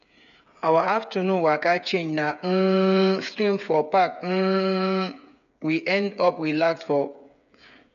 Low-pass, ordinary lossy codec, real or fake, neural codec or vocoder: 7.2 kHz; none; fake; codec, 16 kHz, 8 kbps, FreqCodec, smaller model